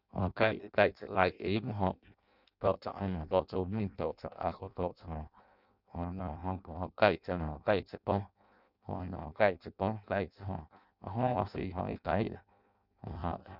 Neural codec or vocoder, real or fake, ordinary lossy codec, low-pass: codec, 16 kHz in and 24 kHz out, 0.6 kbps, FireRedTTS-2 codec; fake; none; 5.4 kHz